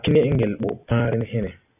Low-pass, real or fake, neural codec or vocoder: 3.6 kHz; real; none